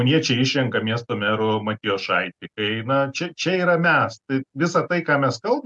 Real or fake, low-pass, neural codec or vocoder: real; 10.8 kHz; none